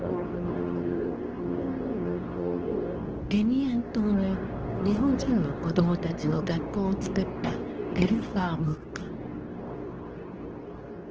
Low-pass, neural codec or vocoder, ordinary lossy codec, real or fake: 7.2 kHz; codec, 24 kHz, 0.9 kbps, WavTokenizer, medium speech release version 2; Opus, 16 kbps; fake